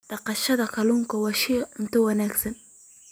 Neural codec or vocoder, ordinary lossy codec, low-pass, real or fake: none; none; none; real